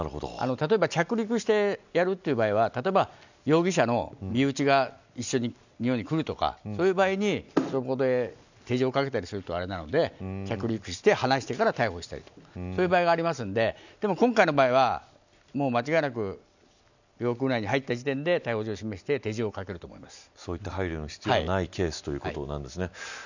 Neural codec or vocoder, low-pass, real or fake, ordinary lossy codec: none; 7.2 kHz; real; none